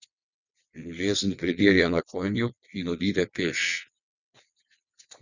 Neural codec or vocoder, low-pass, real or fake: vocoder, 22.05 kHz, 80 mel bands, WaveNeXt; 7.2 kHz; fake